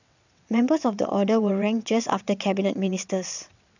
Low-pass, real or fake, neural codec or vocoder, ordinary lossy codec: 7.2 kHz; fake; vocoder, 22.05 kHz, 80 mel bands, WaveNeXt; none